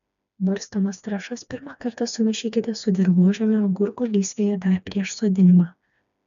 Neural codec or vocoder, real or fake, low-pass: codec, 16 kHz, 2 kbps, FreqCodec, smaller model; fake; 7.2 kHz